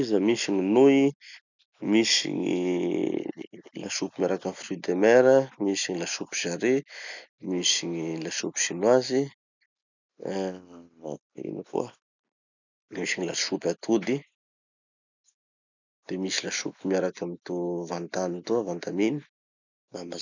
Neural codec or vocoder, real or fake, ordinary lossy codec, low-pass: none; real; none; 7.2 kHz